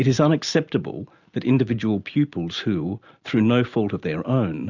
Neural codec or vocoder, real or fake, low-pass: none; real; 7.2 kHz